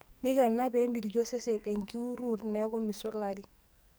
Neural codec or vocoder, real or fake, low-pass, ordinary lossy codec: codec, 44.1 kHz, 2.6 kbps, SNAC; fake; none; none